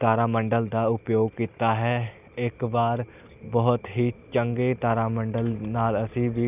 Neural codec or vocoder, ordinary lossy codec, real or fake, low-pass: none; none; real; 3.6 kHz